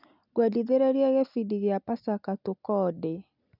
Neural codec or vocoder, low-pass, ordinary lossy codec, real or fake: none; 5.4 kHz; none; real